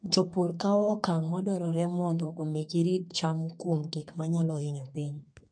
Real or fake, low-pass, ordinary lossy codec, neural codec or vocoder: fake; 9.9 kHz; MP3, 48 kbps; codec, 32 kHz, 1.9 kbps, SNAC